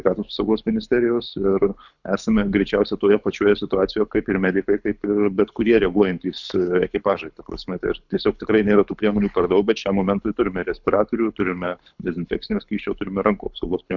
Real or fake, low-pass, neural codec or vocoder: fake; 7.2 kHz; codec, 24 kHz, 6 kbps, HILCodec